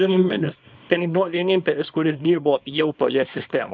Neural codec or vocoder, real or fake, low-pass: codec, 24 kHz, 0.9 kbps, WavTokenizer, small release; fake; 7.2 kHz